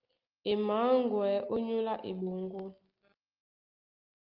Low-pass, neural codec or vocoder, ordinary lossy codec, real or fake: 5.4 kHz; none; Opus, 32 kbps; real